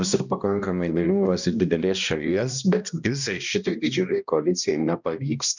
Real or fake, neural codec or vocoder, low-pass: fake; codec, 16 kHz, 1 kbps, X-Codec, HuBERT features, trained on balanced general audio; 7.2 kHz